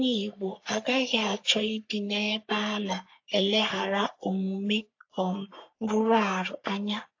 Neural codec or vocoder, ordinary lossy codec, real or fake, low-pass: codec, 44.1 kHz, 3.4 kbps, Pupu-Codec; AAC, 48 kbps; fake; 7.2 kHz